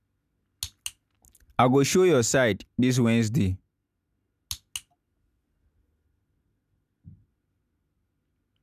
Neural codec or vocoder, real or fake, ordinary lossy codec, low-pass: none; real; none; 14.4 kHz